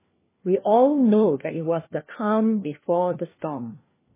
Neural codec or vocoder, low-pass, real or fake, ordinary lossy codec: codec, 16 kHz, 1 kbps, FunCodec, trained on LibriTTS, 50 frames a second; 3.6 kHz; fake; MP3, 16 kbps